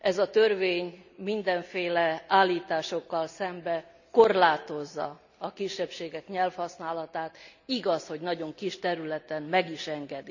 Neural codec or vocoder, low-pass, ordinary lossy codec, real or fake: none; 7.2 kHz; none; real